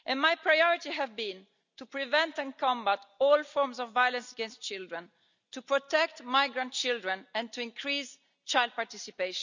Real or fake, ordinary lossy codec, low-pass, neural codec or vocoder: real; none; 7.2 kHz; none